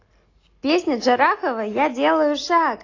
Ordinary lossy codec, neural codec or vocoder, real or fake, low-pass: AAC, 32 kbps; none; real; 7.2 kHz